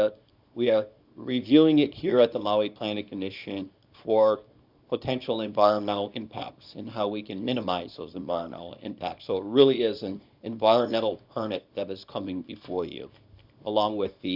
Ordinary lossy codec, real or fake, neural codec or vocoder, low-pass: Opus, 64 kbps; fake; codec, 24 kHz, 0.9 kbps, WavTokenizer, small release; 5.4 kHz